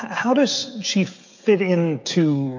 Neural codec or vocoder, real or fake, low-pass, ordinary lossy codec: codec, 16 kHz, 16 kbps, FreqCodec, smaller model; fake; 7.2 kHz; AAC, 48 kbps